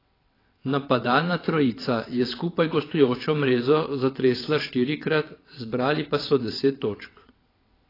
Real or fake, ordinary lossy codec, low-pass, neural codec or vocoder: fake; AAC, 24 kbps; 5.4 kHz; vocoder, 22.05 kHz, 80 mel bands, WaveNeXt